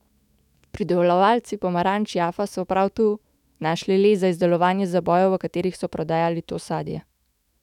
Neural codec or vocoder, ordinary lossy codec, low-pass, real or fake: autoencoder, 48 kHz, 128 numbers a frame, DAC-VAE, trained on Japanese speech; none; 19.8 kHz; fake